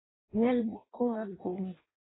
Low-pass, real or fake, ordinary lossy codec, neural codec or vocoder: 7.2 kHz; fake; AAC, 16 kbps; codec, 16 kHz in and 24 kHz out, 0.6 kbps, FireRedTTS-2 codec